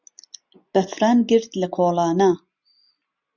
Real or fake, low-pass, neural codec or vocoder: real; 7.2 kHz; none